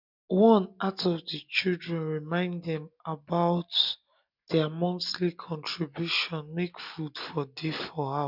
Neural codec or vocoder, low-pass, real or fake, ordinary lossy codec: none; 5.4 kHz; real; none